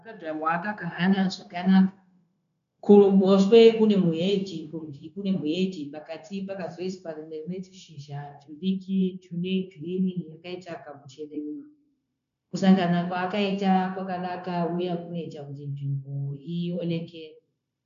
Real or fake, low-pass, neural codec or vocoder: fake; 7.2 kHz; codec, 16 kHz, 0.9 kbps, LongCat-Audio-Codec